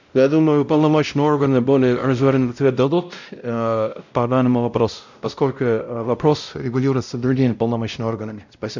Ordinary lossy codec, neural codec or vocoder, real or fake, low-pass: none; codec, 16 kHz, 0.5 kbps, X-Codec, WavLM features, trained on Multilingual LibriSpeech; fake; 7.2 kHz